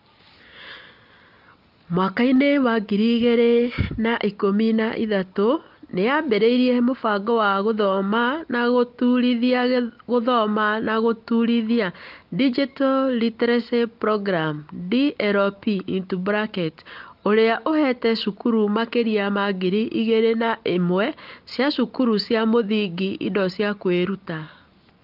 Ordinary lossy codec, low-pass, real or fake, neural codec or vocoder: Opus, 32 kbps; 5.4 kHz; real; none